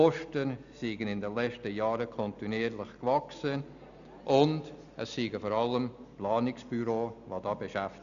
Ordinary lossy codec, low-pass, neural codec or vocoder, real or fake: none; 7.2 kHz; none; real